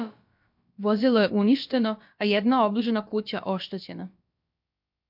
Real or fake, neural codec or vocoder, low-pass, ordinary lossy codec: fake; codec, 16 kHz, about 1 kbps, DyCAST, with the encoder's durations; 5.4 kHz; MP3, 48 kbps